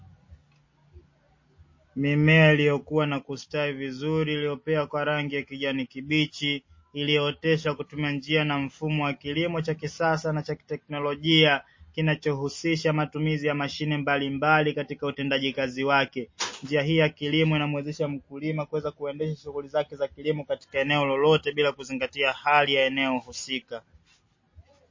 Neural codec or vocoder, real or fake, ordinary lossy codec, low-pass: none; real; MP3, 32 kbps; 7.2 kHz